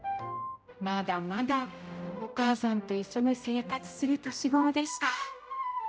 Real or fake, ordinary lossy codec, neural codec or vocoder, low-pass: fake; none; codec, 16 kHz, 0.5 kbps, X-Codec, HuBERT features, trained on general audio; none